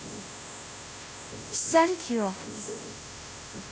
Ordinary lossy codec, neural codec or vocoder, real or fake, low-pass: none; codec, 16 kHz, 0.5 kbps, FunCodec, trained on Chinese and English, 25 frames a second; fake; none